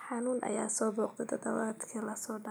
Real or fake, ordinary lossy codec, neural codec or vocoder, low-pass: real; none; none; none